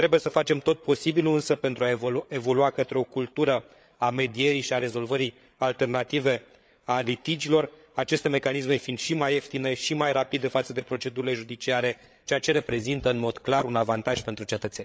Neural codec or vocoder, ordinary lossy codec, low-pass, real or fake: codec, 16 kHz, 8 kbps, FreqCodec, larger model; none; none; fake